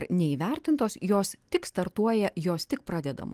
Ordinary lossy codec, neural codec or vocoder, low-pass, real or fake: Opus, 24 kbps; none; 14.4 kHz; real